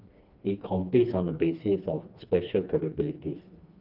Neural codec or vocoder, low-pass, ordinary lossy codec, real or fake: codec, 16 kHz, 2 kbps, FreqCodec, smaller model; 5.4 kHz; Opus, 24 kbps; fake